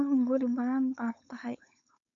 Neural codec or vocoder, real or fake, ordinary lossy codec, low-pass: codec, 16 kHz, 4.8 kbps, FACodec; fake; AAC, 64 kbps; 7.2 kHz